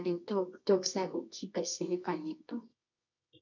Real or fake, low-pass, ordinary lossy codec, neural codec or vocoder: fake; 7.2 kHz; none; codec, 24 kHz, 0.9 kbps, WavTokenizer, medium music audio release